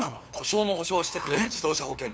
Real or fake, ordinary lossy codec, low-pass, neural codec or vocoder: fake; none; none; codec, 16 kHz, 2 kbps, FunCodec, trained on LibriTTS, 25 frames a second